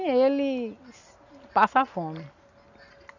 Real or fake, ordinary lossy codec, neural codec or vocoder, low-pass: real; none; none; 7.2 kHz